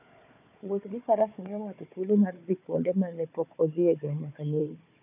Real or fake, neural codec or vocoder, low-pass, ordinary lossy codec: fake; codec, 16 kHz, 4 kbps, FunCodec, trained on Chinese and English, 50 frames a second; 3.6 kHz; none